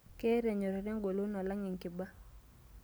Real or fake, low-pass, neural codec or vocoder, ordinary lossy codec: real; none; none; none